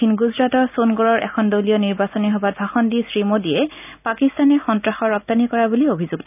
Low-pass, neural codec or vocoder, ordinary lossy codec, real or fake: 3.6 kHz; none; none; real